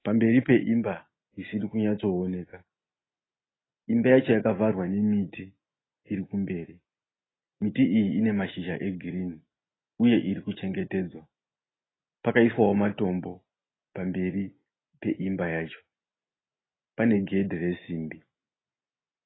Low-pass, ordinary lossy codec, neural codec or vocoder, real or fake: 7.2 kHz; AAC, 16 kbps; none; real